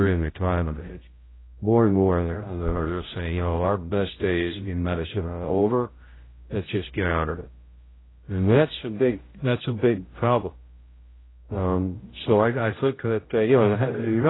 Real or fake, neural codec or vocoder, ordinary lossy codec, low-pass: fake; codec, 16 kHz, 0.5 kbps, X-Codec, HuBERT features, trained on general audio; AAC, 16 kbps; 7.2 kHz